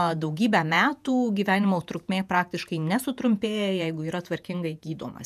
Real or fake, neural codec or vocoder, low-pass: fake; vocoder, 48 kHz, 128 mel bands, Vocos; 14.4 kHz